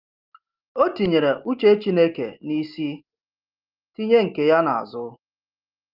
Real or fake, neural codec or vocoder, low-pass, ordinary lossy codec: real; none; 5.4 kHz; Opus, 24 kbps